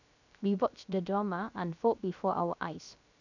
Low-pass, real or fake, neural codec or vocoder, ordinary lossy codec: 7.2 kHz; fake; codec, 16 kHz, 0.3 kbps, FocalCodec; none